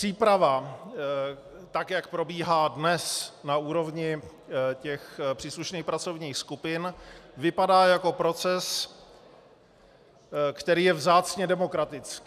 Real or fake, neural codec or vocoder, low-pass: real; none; 14.4 kHz